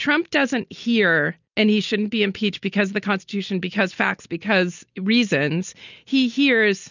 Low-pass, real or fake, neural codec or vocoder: 7.2 kHz; real; none